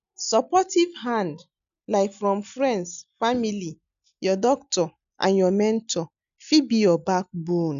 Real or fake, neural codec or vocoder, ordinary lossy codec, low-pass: real; none; none; 7.2 kHz